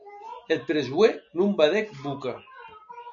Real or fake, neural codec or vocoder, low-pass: real; none; 7.2 kHz